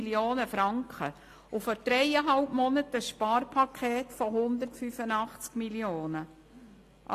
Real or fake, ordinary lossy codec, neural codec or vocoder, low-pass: real; AAC, 48 kbps; none; 14.4 kHz